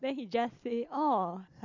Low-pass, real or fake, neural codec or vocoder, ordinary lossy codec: 7.2 kHz; fake; vocoder, 44.1 kHz, 128 mel bands every 256 samples, BigVGAN v2; Opus, 64 kbps